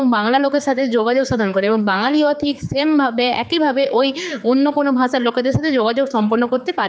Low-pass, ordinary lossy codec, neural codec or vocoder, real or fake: none; none; codec, 16 kHz, 4 kbps, X-Codec, HuBERT features, trained on general audio; fake